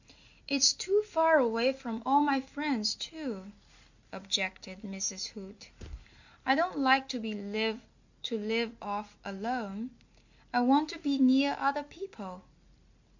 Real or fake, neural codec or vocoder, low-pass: real; none; 7.2 kHz